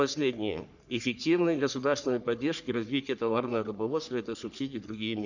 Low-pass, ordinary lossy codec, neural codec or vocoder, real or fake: 7.2 kHz; none; codec, 44.1 kHz, 3.4 kbps, Pupu-Codec; fake